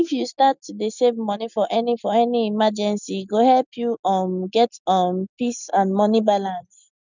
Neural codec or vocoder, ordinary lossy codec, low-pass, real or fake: none; none; 7.2 kHz; real